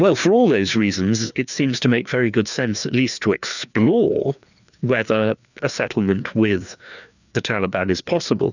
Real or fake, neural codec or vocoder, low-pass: fake; codec, 16 kHz, 2 kbps, FreqCodec, larger model; 7.2 kHz